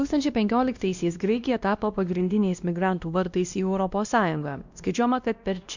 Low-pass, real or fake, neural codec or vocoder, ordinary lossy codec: 7.2 kHz; fake; codec, 16 kHz, 1 kbps, X-Codec, WavLM features, trained on Multilingual LibriSpeech; Opus, 64 kbps